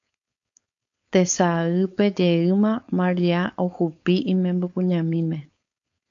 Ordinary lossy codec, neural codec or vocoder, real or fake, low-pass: AAC, 64 kbps; codec, 16 kHz, 4.8 kbps, FACodec; fake; 7.2 kHz